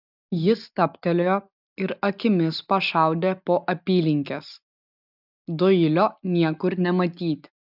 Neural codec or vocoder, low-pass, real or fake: none; 5.4 kHz; real